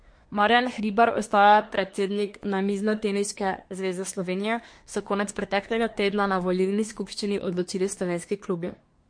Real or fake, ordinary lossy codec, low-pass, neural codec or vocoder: fake; MP3, 48 kbps; 9.9 kHz; codec, 24 kHz, 1 kbps, SNAC